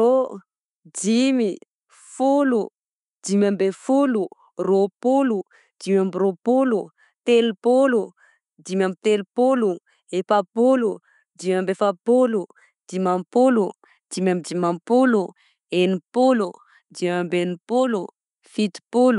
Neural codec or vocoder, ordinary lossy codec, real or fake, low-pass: none; none; real; 10.8 kHz